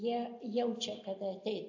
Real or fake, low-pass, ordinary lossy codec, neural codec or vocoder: real; 7.2 kHz; AAC, 48 kbps; none